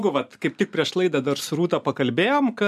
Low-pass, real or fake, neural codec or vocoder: 14.4 kHz; real; none